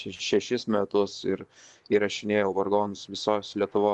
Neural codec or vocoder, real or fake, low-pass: vocoder, 24 kHz, 100 mel bands, Vocos; fake; 10.8 kHz